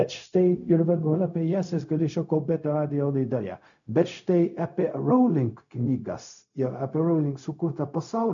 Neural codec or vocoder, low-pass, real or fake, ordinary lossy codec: codec, 16 kHz, 0.4 kbps, LongCat-Audio-Codec; 7.2 kHz; fake; MP3, 96 kbps